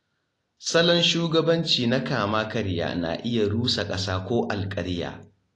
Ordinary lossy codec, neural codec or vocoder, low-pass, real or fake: AAC, 48 kbps; none; 10.8 kHz; real